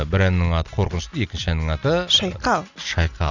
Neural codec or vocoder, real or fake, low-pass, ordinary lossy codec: none; real; 7.2 kHz; none